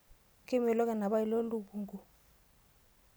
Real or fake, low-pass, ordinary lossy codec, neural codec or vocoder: real; none; none; none